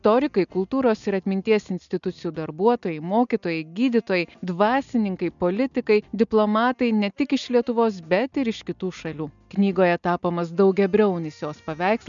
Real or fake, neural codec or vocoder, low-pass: real; none; 7.2 kHz